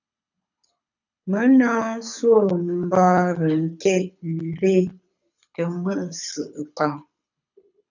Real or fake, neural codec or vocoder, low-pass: fake; codec, 24 kHz, 6 kbps, HILCodec; 7.2 kHz